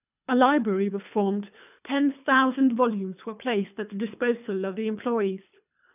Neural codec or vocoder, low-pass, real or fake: codec, 24 kHz, 3 kbps, HILCodec; 3.6 kHz; fake